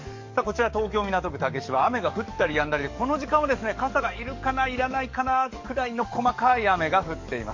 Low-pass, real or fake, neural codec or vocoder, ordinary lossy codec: 7.2 kHz; fake; codec, 44.1 kHz, 7.8 kbps, DAC; MP3, 48 kbps